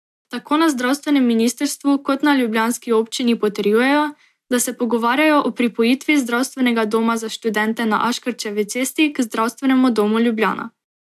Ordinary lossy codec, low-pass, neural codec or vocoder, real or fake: AAC, 96 kbps; 14.4 kHz; none; real